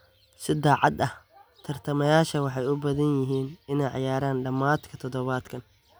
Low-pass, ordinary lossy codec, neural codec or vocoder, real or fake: none; none; none; real